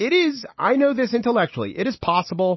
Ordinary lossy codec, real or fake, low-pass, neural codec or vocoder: MP3, 24 kbps; real; 7.2 kHz; none